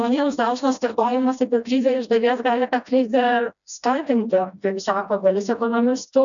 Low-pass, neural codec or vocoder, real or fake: 7.2 kHz; codec, 16 kHz, 1 kbps, FreqCodec, smaller model; fake